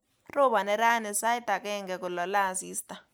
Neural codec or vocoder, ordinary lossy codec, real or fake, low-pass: none; none; real; none